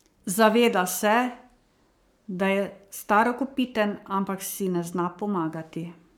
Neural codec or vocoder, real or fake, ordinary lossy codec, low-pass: codec, 44.1 kHz, 7.8 kbps, Pupu-Codec; fake; none; none